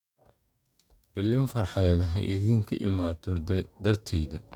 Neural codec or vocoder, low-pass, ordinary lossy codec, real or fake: codec, 44.1 kHz, 2.6 kbps, DAC; 19.8 kHz; none; fake